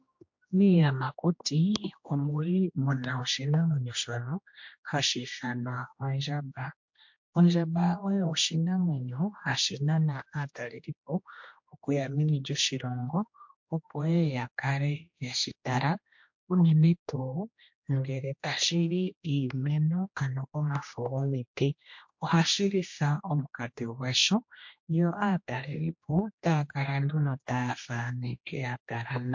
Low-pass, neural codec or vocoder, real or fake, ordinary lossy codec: 7.2 kHz; codec, 16 kHz, 1 kbps, X-Codec, HuBERT features, trained on general audio; fake; MP3, 48 kbps